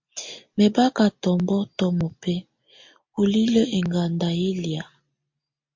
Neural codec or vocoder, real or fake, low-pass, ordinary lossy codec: none; real; 7.2 kHz; MP3, 48 kbps